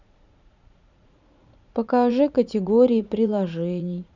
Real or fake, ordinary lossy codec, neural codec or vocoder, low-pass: fake; none; vocoder, 44.1 kHz, 80 mel bands, Vocos; 7.2 kHz